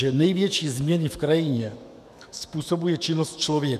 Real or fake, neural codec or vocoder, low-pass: fake; autoencoder, 48 kHz, 128 numbers a frame, DAC-VAE, trained on Japanese speech; 14.4 kHz